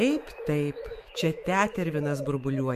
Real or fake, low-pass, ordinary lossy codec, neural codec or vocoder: fake; 14.4 kHz; MP3, 64 kbps; autoencoder, 48 kHz, 128 numbers a frame, DAC-VAE, trained on Japanese speech